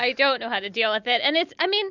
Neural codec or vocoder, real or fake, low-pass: none; real; 7.2 kHz